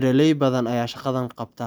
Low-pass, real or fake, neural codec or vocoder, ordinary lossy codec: none; real; none; none